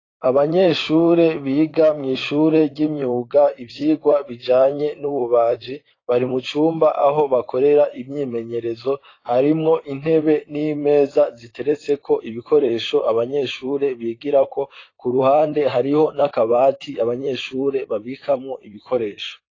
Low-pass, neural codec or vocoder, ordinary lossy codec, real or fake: 7.2 kHz; vocoder, 44.1 kHz, 128 mel bands, Pupu-Vocoder; AAC, 32 kbps; fake